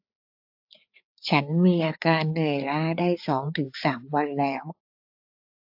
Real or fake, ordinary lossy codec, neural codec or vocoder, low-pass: fake; AAC, 48 kbps; codec, 16 kHz, 4 kbps, FreqCodec, larger model; 5.4 kHz